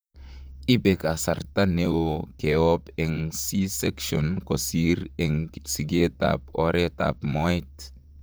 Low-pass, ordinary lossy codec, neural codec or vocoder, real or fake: none; none; vocoder, 44.1 kHz, 128 mel bands, Pupu-Vocoder; fake